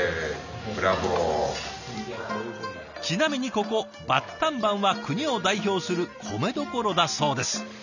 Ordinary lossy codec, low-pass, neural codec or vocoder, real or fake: none; 7.2 kHz; none; real